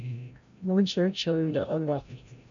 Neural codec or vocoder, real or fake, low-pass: codec, 16 kHz, 0.5 kbps, FreqCodec, larger model; fake; 7.2 kHz